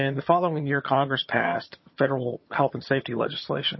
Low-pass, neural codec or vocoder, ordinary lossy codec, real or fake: 7.2 kHz; vocoder, 22.05 kHz, 80 mel bands, HiFi-GAN; MP3, 24 kbps; fake